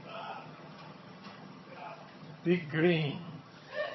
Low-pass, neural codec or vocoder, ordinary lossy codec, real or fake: 7.2 kHz; vocoder, 22.05 kHz, 80 mel bands, HiFi-GAN; MP3, 24 kbps; fake